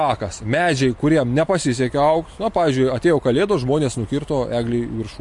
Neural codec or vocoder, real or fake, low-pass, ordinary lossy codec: none; real; 10.8 kHz; MP3, 48 kbps